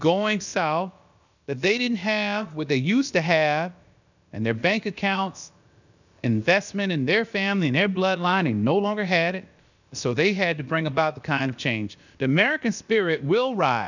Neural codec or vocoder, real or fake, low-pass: codec, 16 kHz, about 1 kbps, DyCAST, with the encoder's durations; fake; 7.2 kHz